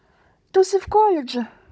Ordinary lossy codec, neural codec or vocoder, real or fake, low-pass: none; codec, 16 kHz, 16 kbps, FunCodec, trained on Chinese and English, 50 frames a second; fake; none